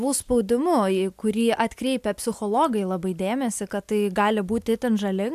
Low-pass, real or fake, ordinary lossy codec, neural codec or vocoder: 14.4 kHz; real; AAC, 96 kbps; none